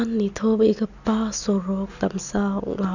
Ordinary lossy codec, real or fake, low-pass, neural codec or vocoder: none; real; 7.2 kHz; none